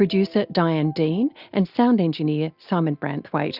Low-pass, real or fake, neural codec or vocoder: 5.4 kHz; real; none